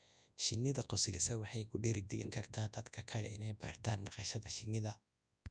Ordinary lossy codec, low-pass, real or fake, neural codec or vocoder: none; 9.9 kHz; fake; codec, 24 kHz, 0.9 kbps, WavTokenizer, large speech release